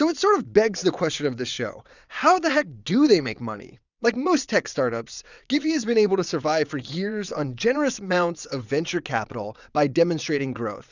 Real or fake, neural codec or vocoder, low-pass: fake; vocoder, 22.05 kHz, 80 mel bands, WaveNeXt; 7.2 kHz